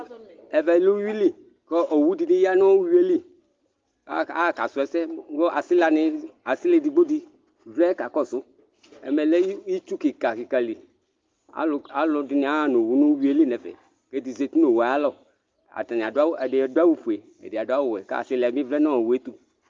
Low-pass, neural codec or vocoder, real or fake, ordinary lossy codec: 7.2 kHz; none; real; Opus, 32 kbps